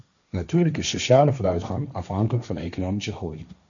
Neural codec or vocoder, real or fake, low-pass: codec, 16 kHz, 1.1 kbps, Voila-Tokenizer; fake; 7.2 kHz